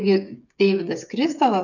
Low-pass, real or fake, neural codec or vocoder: 7.2 kHz; fake; codec, 16 kHz, 8 kbps, FreqCodec, smaller model